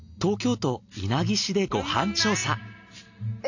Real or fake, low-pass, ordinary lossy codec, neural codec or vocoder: real; 7.2 kHz; none; none